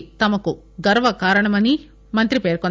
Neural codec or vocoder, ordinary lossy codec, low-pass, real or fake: none; none; none; real